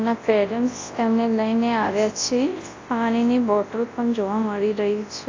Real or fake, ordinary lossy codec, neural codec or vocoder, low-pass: fake; AAC, 32 kbps; codec, 24 kHz, 0.9 kbps, WavTokenizer, large speech release; 7.2 kHz